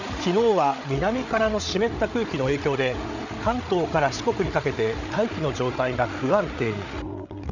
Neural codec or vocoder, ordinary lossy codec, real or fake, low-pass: codec, 16 kHz, 8 kbps, FreqCodec, larger model; none; fake; 7.2 kHz